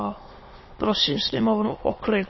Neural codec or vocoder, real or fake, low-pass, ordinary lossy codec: autoencoder, 22.05 kHz, a latent of 192 numbers a frame, VITS, trained on many speakers; fake; 7.2 kHz; MP3, 24 kbps